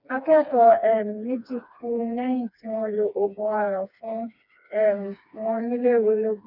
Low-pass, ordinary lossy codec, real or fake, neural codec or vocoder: 5.4 kHz; none; fake; codec, 16 kHz, 2 kbps, FreqCodec, smaller model